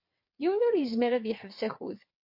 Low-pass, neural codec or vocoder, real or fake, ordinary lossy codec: 5.4 kHz; vocoder, 22.05 kHz, 80 mel bands, WaveNeXt; fake; MP3, 32 kbps